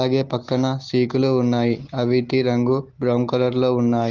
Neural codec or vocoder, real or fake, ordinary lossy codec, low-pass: none; real; Opus, 16 kbps; 7.2 kHz